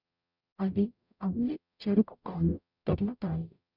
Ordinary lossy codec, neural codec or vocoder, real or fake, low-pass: none; codec, 44.1 kHz, 0.9 kbps, DAC; fake; 5.4 kHz